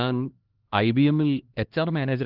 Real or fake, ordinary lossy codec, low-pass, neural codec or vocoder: fake; Opus, 16 kbps; 5.4 kHz; codec, 16 kHz, 1 kbps, X-Codec, HuBERT features, trained on LibriSpeech